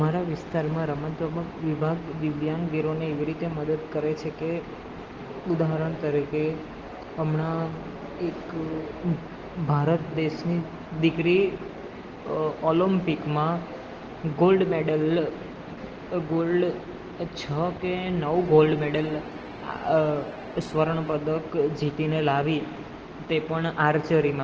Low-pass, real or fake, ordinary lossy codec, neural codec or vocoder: 7.2 kHz; real; Opus, 16 kbps; none